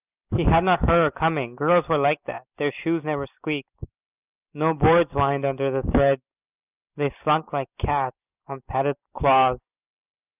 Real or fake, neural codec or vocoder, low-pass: real; none; 3.6 kHz